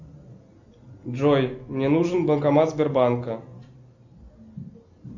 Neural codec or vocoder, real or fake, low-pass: none; real; 7.2 kHz